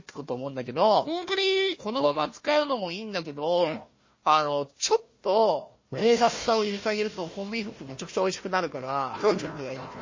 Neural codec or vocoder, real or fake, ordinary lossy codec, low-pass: codec, 16 kHz, 1 kbps, FunCodec, trained on Chinese and English, 50 frames a second; fake; MP3, 32 kbps; 7.2 kHz